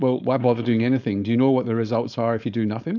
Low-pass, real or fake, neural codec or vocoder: 7.2 kHz; fake; codec, 16 kHz, 4.8 kbps, FACodec